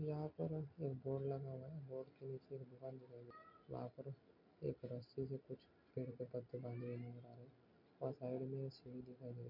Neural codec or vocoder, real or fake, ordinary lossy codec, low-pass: none; real; MP3, 48 kbps; 5.4 kHz